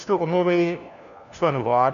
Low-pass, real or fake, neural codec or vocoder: 7.2 kHz; fake; codec, 16 kHz, 1 kbps, FunCodec, trained on LibriTTS, 50 frames a second